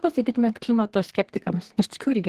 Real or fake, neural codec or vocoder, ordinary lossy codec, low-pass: fake; codec, 32 kHz, 1.9 kbps, SNAC; Opus, 16 kbps; 14.4 kHz